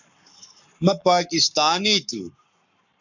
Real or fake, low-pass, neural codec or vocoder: fake; 7.2 kHz; codec, 44.1 kHz, 7.8 kbps, Pupu-Codec